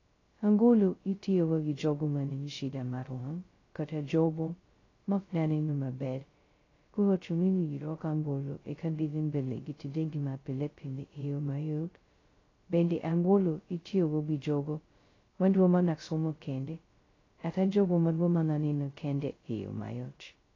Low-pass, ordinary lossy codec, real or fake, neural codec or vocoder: 7.2 kHz; AAC, 32 kbps; fake; codec, 16 kHz, 0.2 kbps, FocalCodec